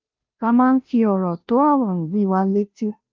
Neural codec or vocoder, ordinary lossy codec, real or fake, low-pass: codec, 16 kHz, 0.5 kbps, FunCodec, trained on Chinese and English, 25 frames a second; Opus, 24 kbps; fake; 7.2 kHz